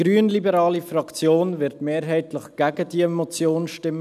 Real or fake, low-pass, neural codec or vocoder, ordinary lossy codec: real; 14.4 kHz; none; none